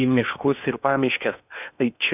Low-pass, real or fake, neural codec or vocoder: 3.6 kHz; fake; codec, 16 kHz in and 24 kHz out, 0.8 kbps, FocalCodec, streaming, 65536 codes